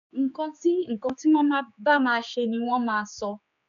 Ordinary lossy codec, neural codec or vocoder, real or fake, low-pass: none; codec, 16 kHz, 4 kbps, X-Codec, HuBERT features, trained on general audio; fake; 7.2 kHz